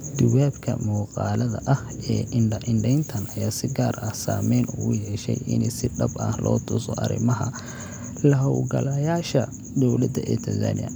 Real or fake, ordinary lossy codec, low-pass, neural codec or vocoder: real; none; none; none